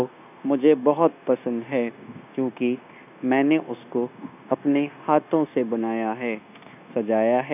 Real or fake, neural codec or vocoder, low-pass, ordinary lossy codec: fake; codec, 16 kHz, 0.9 kbps, LongCat-Audio-Codec; 3.6 kHz; none